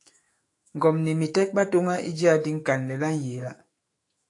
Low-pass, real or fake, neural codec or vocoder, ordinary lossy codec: 10.8 kHz; fake; autoencoder, 48 kHz, 128 numbers a frame, DAC-VAE, trained on Japanese speech; AAC, 48 kbps